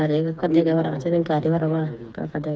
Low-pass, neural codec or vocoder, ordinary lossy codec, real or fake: none; codec, 16 kHz, 4 kbps, FreqCodec, smaller model; none; fake